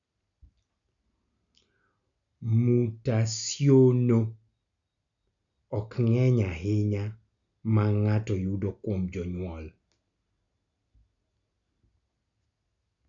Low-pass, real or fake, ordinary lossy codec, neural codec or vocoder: 7.2 kHz; real; none; none